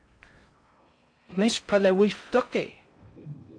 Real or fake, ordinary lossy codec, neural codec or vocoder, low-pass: fake; AAC, 64 kbps; codec, 16 kHz in and 24 kHz out, 0.6 kbps, FocalCodec, streaming, 4096 codes; 9.9 kHz